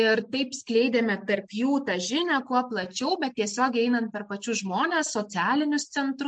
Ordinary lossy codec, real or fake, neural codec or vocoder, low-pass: MP3, 64 kbps; real; none; 9.9 kHz